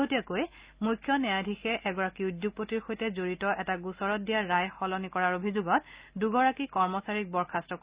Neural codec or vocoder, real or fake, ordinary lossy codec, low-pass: none; real; Opus, 64 kbps; 3.6 kHz